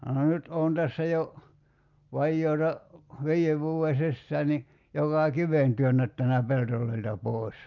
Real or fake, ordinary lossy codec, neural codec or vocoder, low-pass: real; Opus, 24 kbps; none; 7.2 kHz